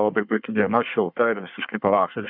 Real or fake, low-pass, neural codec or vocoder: fake; 5.4 kHz; codec, 24 kHz, 1 kbps, SNAC